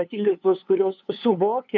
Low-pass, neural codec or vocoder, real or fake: 7.2 kHz; codec, 16 kHz, 2 kbps, FunCodec, trained on LibriTTS, 25 frames a second; fake